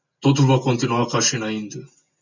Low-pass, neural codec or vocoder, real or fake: 7.2 kHz; none; real